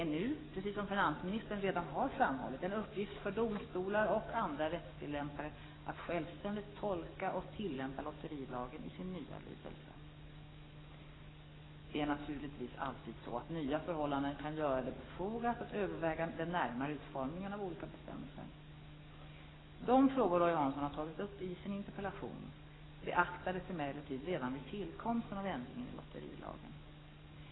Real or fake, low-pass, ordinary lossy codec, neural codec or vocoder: fake; 7.2 kHz; AAC, 16 kbps; codec, 44.1 kHz, 7.8 kbps, DAC